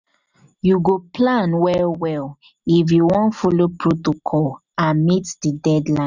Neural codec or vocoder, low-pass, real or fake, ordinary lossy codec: none; 7.2 kHz; real; none